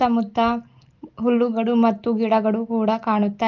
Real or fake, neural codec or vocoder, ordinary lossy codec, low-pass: real; none; Opus, 32 kbps; 7.2 kHz